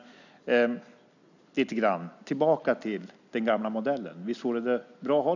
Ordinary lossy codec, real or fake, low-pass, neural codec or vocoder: none; real; 7.2 kHz; none